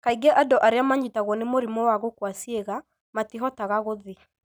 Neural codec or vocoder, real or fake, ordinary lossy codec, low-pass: none; real; none; none